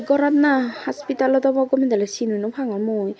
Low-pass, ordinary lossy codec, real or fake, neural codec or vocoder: none; none; real; none